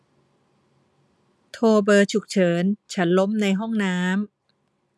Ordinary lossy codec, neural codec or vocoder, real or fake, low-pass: none; none; real; none